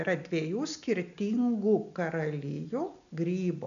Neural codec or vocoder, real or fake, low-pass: none; real; 7.2 kHz